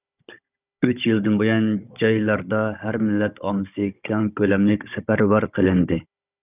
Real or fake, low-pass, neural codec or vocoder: fake; 3.6 kHz; codec, 16 kHz, 16 kbps, FunCodec, trained on Chinese and English, 50 frames a second